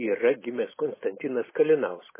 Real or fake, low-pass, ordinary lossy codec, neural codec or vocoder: real; 3.6 kHz; MP3, 16 kbps; none